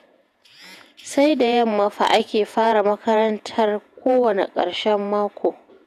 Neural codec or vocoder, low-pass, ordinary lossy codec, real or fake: vocoder, 48 kHz, 128 mel bands, Vocos; 14.4 kHz; none; fake